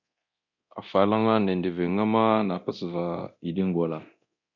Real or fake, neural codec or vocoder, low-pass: fake; codec, 24 kHz, 0.9 kbps, DualCodec; 7.2 kHz